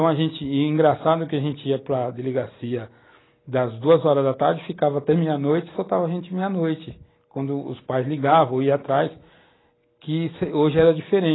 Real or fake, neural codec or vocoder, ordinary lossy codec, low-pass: real; none; AAC, 16 kbps; 7.2 kHz